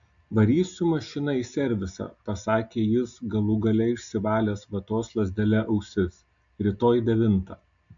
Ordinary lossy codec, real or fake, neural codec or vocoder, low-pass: AAC, 64 kbps; real; none; 7.2 kHz